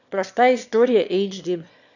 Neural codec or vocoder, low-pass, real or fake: autoencoder, 22.05 kHz, a latent of 192 numbers a frame, VITS, trained on one speaker; 7.2 kHz; fake